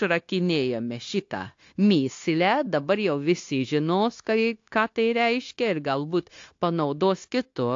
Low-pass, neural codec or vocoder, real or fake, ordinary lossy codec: 7.2 kHz; codec, 16 kHz, 0.9 kbps, LongCat-Audio-Codec; fake; AAC, 48 kbps